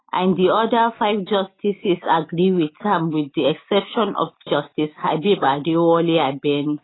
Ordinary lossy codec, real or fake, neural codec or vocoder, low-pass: AAC, 16 kbps; real; none; 7.2 kHz